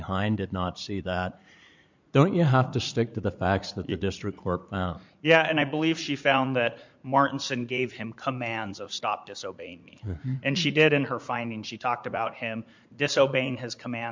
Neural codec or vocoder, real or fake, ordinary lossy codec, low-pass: vocoder, 44.1 kHz, 80 mel bands, Vocos; fake; MP3, 64 kbps; 7.2 kHz